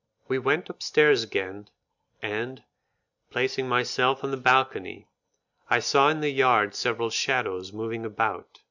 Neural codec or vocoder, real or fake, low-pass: none; real; 7.2 kHz